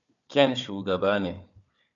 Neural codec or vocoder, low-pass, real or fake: codec, 16 kHz, 4 kbps, FunCodec, trained on Chinese and English, 50 frames a second; 7.2 kHz; fake